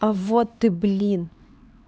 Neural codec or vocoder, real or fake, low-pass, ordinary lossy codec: codec, 16 kHz, 4 kbps, X-Codec, HuBERT features, trained on LibriSpeech; fake; none; none